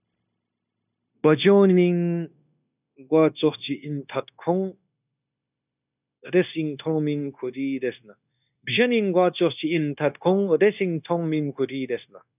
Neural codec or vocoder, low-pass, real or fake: codec, 16 kHz, 0.9 kbps, LongCat-Audio-Codec; 3.6 kHz; fake